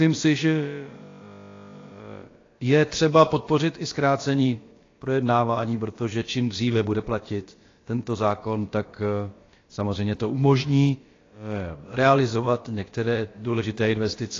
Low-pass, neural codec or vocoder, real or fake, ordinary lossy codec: 7.2 kHz; codec, 16 kHz, about 1 kbps, DyCAST, with the encoder's durations; fake; AAC, 32 kbps